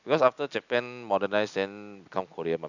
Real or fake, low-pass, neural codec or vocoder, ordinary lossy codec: real; 7.2 kHz; none; none